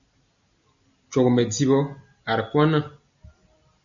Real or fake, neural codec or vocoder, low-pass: real; none; 7.2 kHz